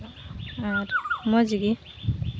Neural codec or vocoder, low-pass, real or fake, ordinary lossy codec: none; none; real; none